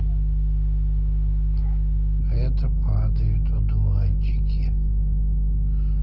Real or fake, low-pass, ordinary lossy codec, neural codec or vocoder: real; 5.4 kHz; Opus, 16 kbps; none